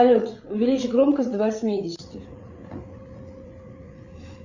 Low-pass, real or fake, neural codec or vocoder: 7.2 kHz; fake; codec, 16 kHz, 8 kbps, FreqCodec, larger model